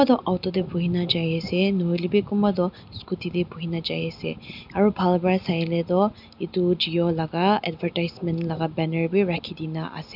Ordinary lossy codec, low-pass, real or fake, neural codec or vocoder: none; 5.4 kHz; real; none